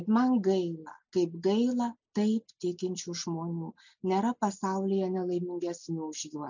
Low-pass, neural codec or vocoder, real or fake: 7.2 kHz; none; real